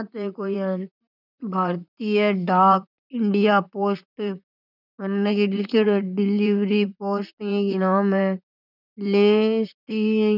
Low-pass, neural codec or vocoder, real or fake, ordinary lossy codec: 5.4 kHz; vocoder, 44.1 kHz, 128 mel bands, Pupu-Vocoder; fake; none